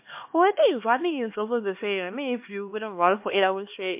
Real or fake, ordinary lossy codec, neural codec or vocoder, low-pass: fake; MP3, 32 kbps; codec, 16 kHz, 2 kbps, X-Codec, HuBERT features, trained on LibriSpeech; 3.6 kHz